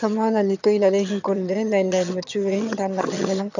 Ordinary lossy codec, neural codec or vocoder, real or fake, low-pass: none; vocoder, 22.05 kHz, 80 mel bands, HiFi-GAN; fake; 7.2 kHz